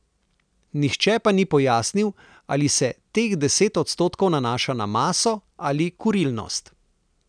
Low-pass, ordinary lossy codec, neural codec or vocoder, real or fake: 9.9 kHz; none; none; real